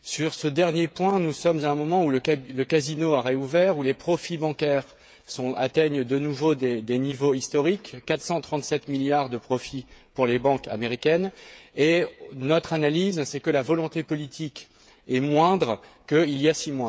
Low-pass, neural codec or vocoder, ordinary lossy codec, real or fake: none; codec, 16 kHz, 8 kbps, FreqCodec, smaller model; none; fake